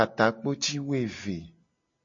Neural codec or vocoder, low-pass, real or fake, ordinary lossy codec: none; 7.2 kHz; real; MP3, 32 kbps